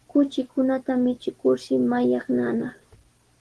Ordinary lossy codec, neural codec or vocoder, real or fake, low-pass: Opus, 16 kbps; none; real; 10.8 kHz